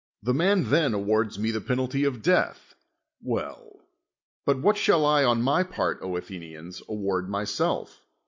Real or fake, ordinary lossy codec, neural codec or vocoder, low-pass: real; MP3, 48 kbps; none; 7.2 kHz